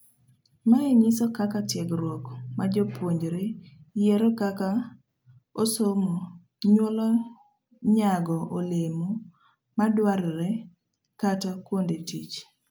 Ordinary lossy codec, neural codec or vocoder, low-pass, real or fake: none; none; none; real